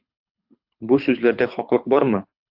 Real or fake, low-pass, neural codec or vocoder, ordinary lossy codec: fake; 5.4 kHz; codec, 24 kHz, 6 kbps, HILCodec; AAC, 48 kbps